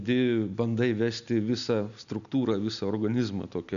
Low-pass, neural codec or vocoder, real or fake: 7.2 kHz; none; real